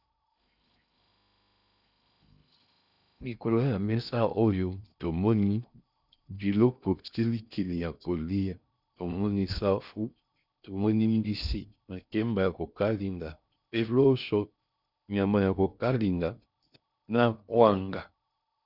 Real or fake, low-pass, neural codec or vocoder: fake; 5.4 kHz; codec, 16 kHz in and 24 kHz out, 0.6 kbps, FocalCodec, streaming, 2048 codes